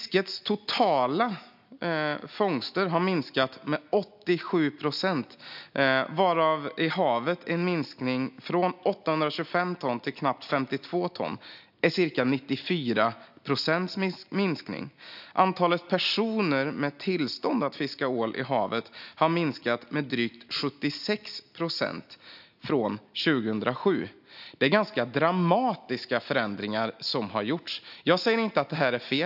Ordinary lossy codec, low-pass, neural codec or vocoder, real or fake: AAC, 48 kbps; 5.4 kHz; none; real